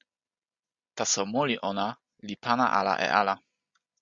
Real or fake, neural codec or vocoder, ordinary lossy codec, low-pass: real; none; Opus, 64 kbps; 7.2 kHz